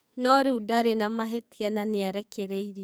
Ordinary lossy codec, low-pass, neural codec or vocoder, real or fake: none; none; codec, 44.1 kHz, 2.6 kbps, SNAC; fake